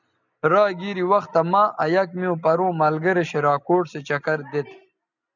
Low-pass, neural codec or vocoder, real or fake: 7.2 kHz; none; real